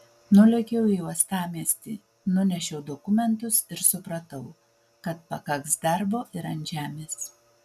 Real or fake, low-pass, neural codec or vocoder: real; 14.4 kHz; none